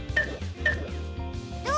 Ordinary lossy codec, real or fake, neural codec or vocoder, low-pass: none; real; none; none